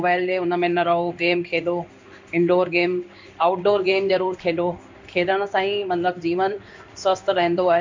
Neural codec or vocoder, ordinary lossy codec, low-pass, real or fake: codec, 16 kHz in and 24 kHz out, 1 kbps, XY-Tokenizer; MP3, 64 kbps; 7.2 kHz; fake